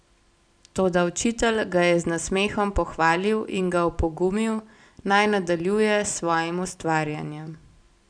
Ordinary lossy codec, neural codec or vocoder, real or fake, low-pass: none; none; real; 9.9 kHz